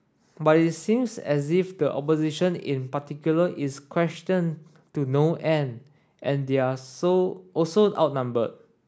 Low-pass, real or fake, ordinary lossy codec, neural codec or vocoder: none; real; none; none